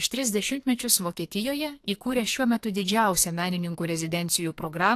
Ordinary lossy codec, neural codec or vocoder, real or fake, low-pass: AAC, 64 kbps; codec, 44.1 kHz, 2.6 kbps, SNAC; fake; 14.4 kHz